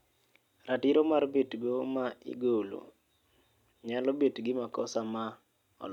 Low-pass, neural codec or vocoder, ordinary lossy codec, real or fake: 19.8 kHz; none; none; real